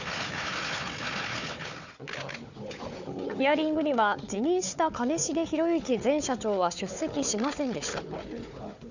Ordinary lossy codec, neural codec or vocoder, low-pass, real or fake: none; codec, 16 kHz, 4 kbps, FunCodec, trained on Chinese and English, 50 frames a second; 7.2 kHz; fake